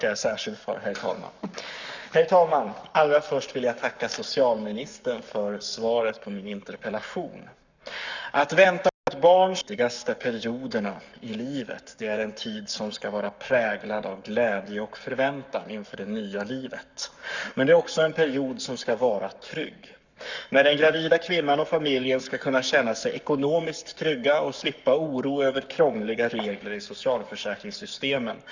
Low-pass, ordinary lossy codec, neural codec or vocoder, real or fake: 7.2 kHz; none; codec, 44.1 kHz, 7.8 kbps, Pupu-Codec; fake